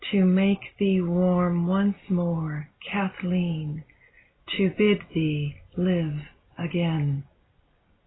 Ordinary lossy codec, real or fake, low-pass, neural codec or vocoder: AAC, 16 kbps; real; 7.2 kHz; none